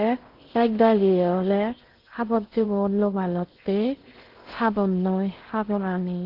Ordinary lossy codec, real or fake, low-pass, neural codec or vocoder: Opus, 16 kbps; fake; 5.4 kHz; codec, 16 kHz in and 24 kHz out, 0.6 kbps, FocalCodec, streaming, 4096 codes